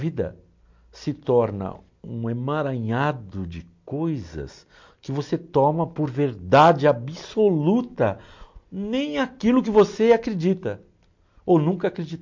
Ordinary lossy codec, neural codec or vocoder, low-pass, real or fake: MP3, 48 kbps; none; 7.2 kHz; real